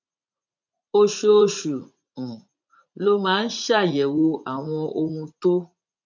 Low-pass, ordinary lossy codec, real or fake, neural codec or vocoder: 7.2 kHz; none; fake; vocoder, 44.1 kHz, 80 mel bands, Vocos